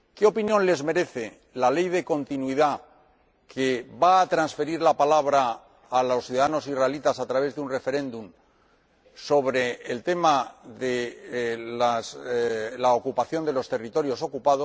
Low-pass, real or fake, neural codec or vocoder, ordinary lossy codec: none; real; none; none